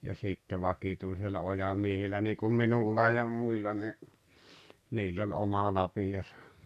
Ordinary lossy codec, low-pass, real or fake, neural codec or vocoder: none; 14.4 kHz; fake; codec, 44.1 kHz, 2.6 kbps, SNAC